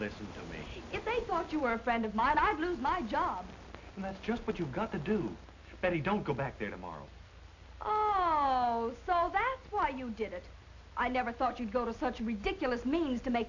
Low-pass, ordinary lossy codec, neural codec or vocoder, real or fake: 7.2 kHz; AAC, 48 kbps; none; real